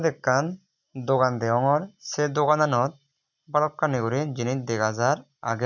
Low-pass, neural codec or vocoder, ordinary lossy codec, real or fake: 7.2 kHz; none; none; real